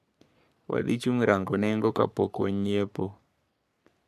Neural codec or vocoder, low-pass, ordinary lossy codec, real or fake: codec, 44.1 kHz, 3.4 kbps, Pupu-Codec; 14.4 kHz; none; fake